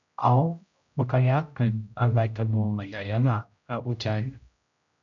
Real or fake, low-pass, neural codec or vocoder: fake; 7.2 kHz; codec, 16 kHz, 0.5 kbps, X-Codec, HuBERT features, trained on general audio